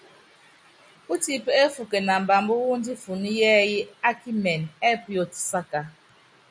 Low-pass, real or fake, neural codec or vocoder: 9.9 kHz; real; none